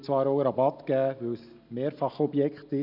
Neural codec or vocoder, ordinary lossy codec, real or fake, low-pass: none; none; real; 5.4 kHz